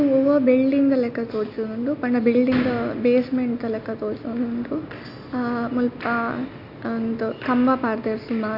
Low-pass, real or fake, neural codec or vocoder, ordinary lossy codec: 5.4 kHz; real; none; AAC, 48 kbps